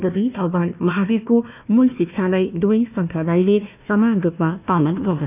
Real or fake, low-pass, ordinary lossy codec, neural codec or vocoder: fake; 3.6 kHz; none; codec, 16 kHz, 1 kbps, FunCodec, trained on Chinese and English, 50 frames a second